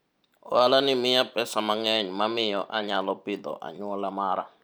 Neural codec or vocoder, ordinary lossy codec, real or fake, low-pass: vocoder, 44.1 kHz, 128 mel bands every 512 samples, BigVGAN v2; none; fake; none